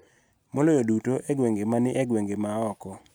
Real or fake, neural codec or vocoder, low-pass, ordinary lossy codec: real; none; none; none